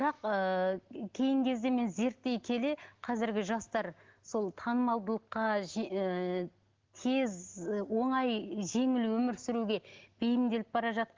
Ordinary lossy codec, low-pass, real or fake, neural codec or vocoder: Opus, 16 kbps; 7.2 kHz; real; none